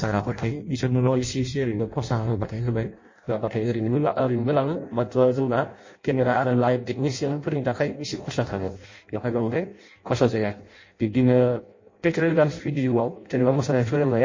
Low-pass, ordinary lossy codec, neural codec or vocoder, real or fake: 7.2 kHz; MP3, 32 kbps; codec, 16 kHz in and 24 kHz out, 0.6 kbps, FireRedTTS-2 codec; fake